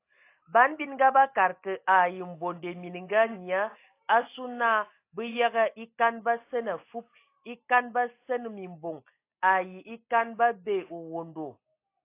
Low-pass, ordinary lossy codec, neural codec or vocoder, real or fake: 3.6 kHz; AAC, 24 kbps; none; real